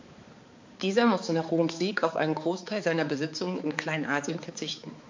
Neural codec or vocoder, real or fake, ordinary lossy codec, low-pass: codec, 16 kHz, 4 kbps, X-Codec, HuBERT features, trained on balanced general audio; fake; MP3, 48 kbps; 7.2 kHz